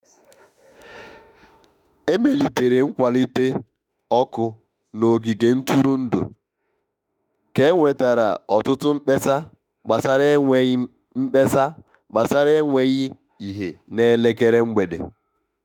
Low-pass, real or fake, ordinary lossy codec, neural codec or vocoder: 19.8 kHz; fake; none; autoencoder, 48 kHz, 32 numbers a frame, DAC-VAE, trained on Japanese speech